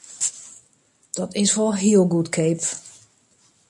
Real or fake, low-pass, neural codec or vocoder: real; 10.8 kHz; none